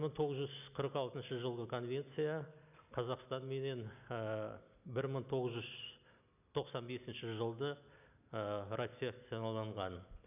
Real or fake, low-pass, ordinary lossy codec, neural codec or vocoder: real; 3.6 kHz; none; none